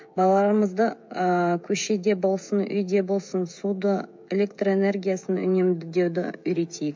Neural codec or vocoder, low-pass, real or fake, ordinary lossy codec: codec, 16 kHz, 16 kbps, FreqCodec, smaller model; 7.2 kHz; fake; MP3, 48 kbps